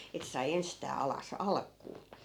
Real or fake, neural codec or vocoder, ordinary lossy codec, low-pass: real; none; none; 19.8 kHz